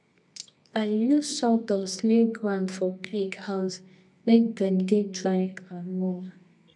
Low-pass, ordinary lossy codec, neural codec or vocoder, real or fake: 10.8 kHz; none; codec, 24 kHz, 0.9 kbps, WavTokenizer, medium music audio release; fake